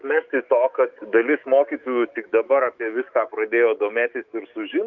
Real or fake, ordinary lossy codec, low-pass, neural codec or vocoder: fake; Opus, 32 kbps; 7.2 kHz; autoencoder, 48 kHz, 128 numbers a frame, DAC-VAE, trained on Japanese speech